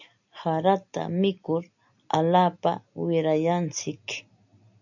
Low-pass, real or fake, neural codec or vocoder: 7.2 kHz; real; none